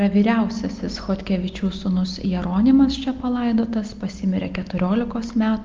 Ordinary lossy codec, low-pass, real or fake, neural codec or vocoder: Opus, 24 kbps; 7.2 kHz; real; none